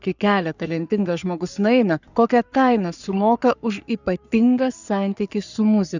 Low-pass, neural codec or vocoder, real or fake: 7.2 kHz; codec, 44.1 kHz, 3.4 kbps, Pupu-Codec; fake